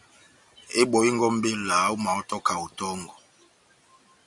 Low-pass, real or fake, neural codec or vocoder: 10.8 kHz; real; none